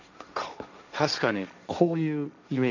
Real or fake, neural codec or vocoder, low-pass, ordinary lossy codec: fake; codec, 16 kHz, 1.1 kbps, Voila-Tokenizer; 7.2 kHz; none